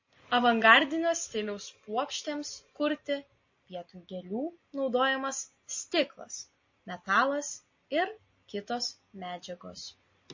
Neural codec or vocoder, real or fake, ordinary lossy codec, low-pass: none; real; MP3, 32 kbps; 7.2 kHz